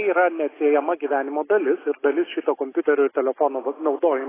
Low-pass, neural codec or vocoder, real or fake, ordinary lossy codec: 3.6 kHz; none; real; AAC, 16 kbps